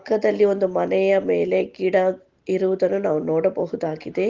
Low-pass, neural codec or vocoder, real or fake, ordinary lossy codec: 7.2 kHz; none; real; Opus, 16 kbps